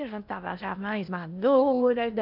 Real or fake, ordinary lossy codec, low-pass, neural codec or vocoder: fake; none; 5.4 kHz; codec, 16 kHz in and 24 kHz out, 0.8 kbps, FocalCodec, streaming, 65536 codes